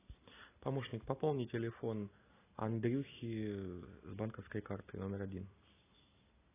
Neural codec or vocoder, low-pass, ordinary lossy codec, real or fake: none; 3.6 kHz; AAC, 16 kbps; real